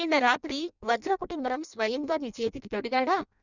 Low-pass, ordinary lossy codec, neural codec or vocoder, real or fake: 7.2 kHz; none; codec, 16 kHz in and 24 kHz out, 0.6 kbps, FireRedTTS-2 codec; fake